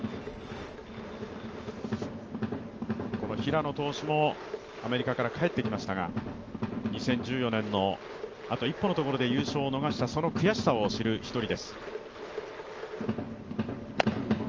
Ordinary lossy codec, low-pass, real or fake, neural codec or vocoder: Opus, 24 kbps; 7.2 kHz; real; none